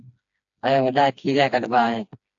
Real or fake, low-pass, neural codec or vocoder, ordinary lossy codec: fake; 7.2 kHz; codec, 16 kHz, 2 kbps, FreqCodec, smaller model; AAC, 64 kbps